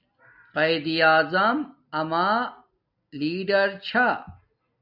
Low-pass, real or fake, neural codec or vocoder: 5.4 kHz; real; none